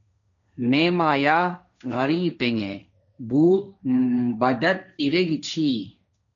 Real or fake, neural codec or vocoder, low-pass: fake; codec, 16 kHz, 1.1 kbps, Voila-Tokenizer; 7.2 kHz